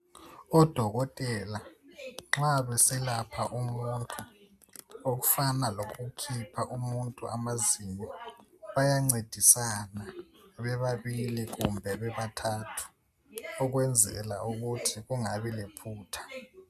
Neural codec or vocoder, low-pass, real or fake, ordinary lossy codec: none; 14.4 kHz; real; AAC, 96 kbps